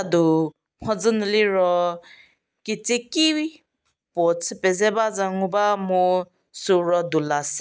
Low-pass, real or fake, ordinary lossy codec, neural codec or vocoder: none; real; none; none